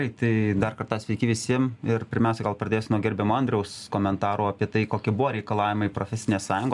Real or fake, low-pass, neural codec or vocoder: real; 10.8 kHz; none